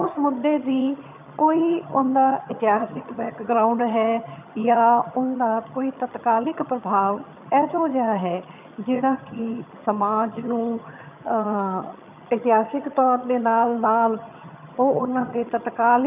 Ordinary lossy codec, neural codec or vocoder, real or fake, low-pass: none; vocoder, 22.05 kHz, 80 mel bands, HiFi-GAN; fake; 3.6 kHz